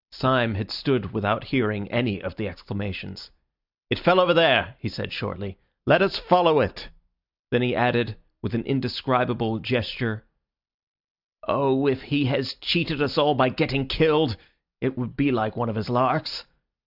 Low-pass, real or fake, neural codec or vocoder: 5.4 kHz; real; none